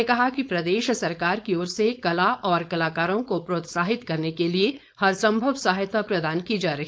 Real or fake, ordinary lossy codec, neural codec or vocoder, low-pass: fake; none; codec, 16 kHz, 4.8 kbps, FACodec; none